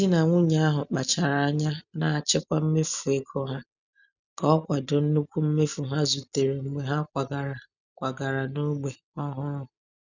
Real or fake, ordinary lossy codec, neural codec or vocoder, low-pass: real; none; none; 7.2 kHz